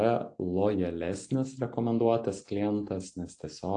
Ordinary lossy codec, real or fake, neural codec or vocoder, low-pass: AAC, 48 kbps; real; none; 9.9 kHz